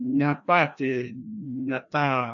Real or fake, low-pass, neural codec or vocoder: fake; 7.2 kHz; codec, 16 kHz, 1 kbps, FreqCodec, larger model